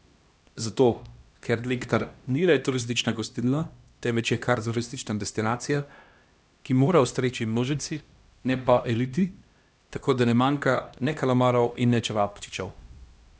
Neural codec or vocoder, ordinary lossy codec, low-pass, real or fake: codec, 16 kHz, 1 kbps, X-Codec, HuBERT features, trained on LibriSpeech; none; none; fake